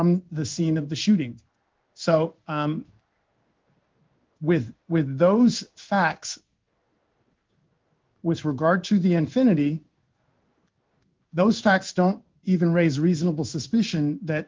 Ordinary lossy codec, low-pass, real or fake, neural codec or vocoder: Opus, 24 kbps; 7.2 kHz; fake; codec, 16 kHz in and 24 kHz out, 1 kbps, XY-Tokenizer